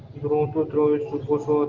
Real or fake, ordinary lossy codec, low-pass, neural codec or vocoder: real; Opus, 16 kbps; 7.2 kHz; none